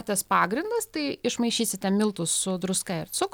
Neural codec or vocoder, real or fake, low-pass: none; real; 19.8 kHz